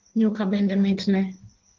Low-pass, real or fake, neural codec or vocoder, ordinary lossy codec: 7.2 kHz; fake; codec, 16 kHz in and 24 kHz out, 1.1 kbps, FireRedTTS-2 codec; Opus, 16 kbps